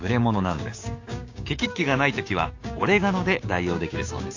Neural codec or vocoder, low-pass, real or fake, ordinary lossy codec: codec, 16 kHz, 6 kbps, DAC; 7.2 kHz; fake; AAC, 48 kbps